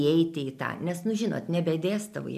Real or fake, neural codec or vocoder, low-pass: real; none; 14.4 kHz